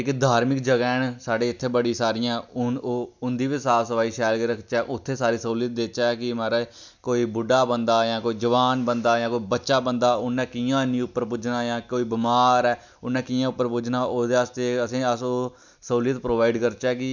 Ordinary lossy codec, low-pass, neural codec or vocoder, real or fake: none; 7.2 kHz; none; real